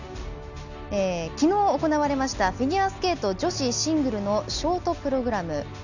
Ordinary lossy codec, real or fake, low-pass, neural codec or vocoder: none; real; 7.2 kHz; none